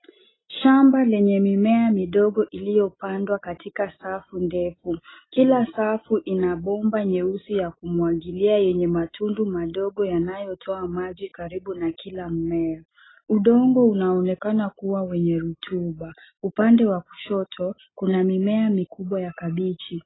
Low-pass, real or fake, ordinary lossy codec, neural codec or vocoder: 7.2 kHz; real; AAC, 16 kbps; none